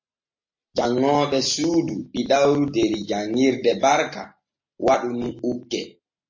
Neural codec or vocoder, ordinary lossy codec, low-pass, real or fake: none; MP3, 32 kbps; 7.2 kHz; real